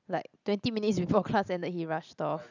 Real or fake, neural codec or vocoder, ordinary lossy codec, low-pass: real; none; none; 7.2 kHz